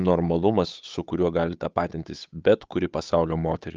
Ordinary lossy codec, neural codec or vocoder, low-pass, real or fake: Opus, 24 kbps; codec, 16 kHz, 8 kbps, FunCodec, trained on Chinese and English, 25 frames a second; 7.2 kHz; fake